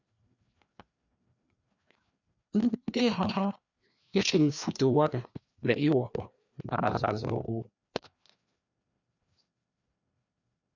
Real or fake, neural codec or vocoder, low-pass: fake; codec, 16 kHz, 2 kbps, FreqCodec, larger model; 7.2 kHz